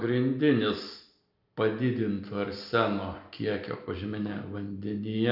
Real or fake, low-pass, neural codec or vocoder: real; 5.4 kHz; none